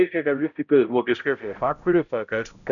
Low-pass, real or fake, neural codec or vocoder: 7.2 kHz; fake; codec, 16 kHz, 0.5 kbps, X-Codec, HuBERT features, trained on balanced general audio